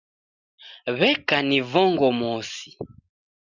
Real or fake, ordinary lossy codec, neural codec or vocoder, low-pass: real; Opus, 64 kbps; none; 7.2 kHz